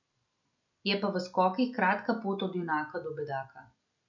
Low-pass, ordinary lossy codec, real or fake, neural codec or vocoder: 7.2 kHz; none; real; none